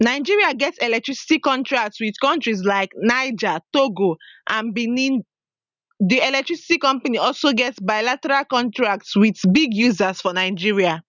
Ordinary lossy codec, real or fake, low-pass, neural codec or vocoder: none; real; 7.2 kHz; none